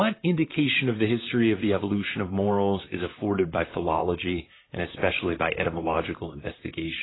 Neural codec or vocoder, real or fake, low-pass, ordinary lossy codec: vocoder, 44.1 kHz, 128 mel bands, Pupu-Vocoder; fake; 7.2 kHz; AAC, 16 kbps